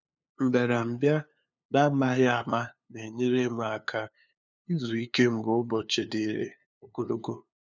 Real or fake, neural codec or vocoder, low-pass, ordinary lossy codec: fake; codec, 16 kHz, 2 kbps, FunCodec, trained on LibriTTS, 25 frames a second; 7.2 kHz; none